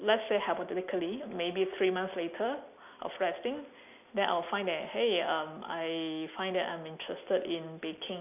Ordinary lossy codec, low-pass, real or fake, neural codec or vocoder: none; 3.6 kHz; real; none